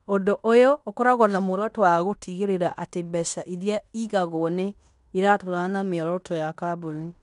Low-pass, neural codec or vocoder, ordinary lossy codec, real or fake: 10.8 kHz; codec, 16 kHz in and 24 kHz out, 0.9 kbps, LongCat-Audio-Codec, fine tuned four codebook decoder; none; fake